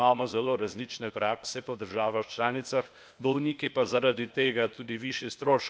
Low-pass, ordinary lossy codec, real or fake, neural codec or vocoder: none; none; fake; codec, 16 kHz, 0.8 kbps, ZipCodec